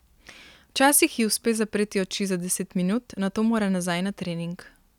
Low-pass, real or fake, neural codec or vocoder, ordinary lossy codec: 19.8 kHz; real; none; none